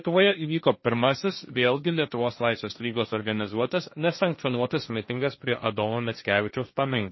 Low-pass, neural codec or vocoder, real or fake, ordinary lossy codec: 7.2 kHz; codec, 16 kHz, 1.1 kbps, Voila-Tokenizer; fake; MP3, 24 kbps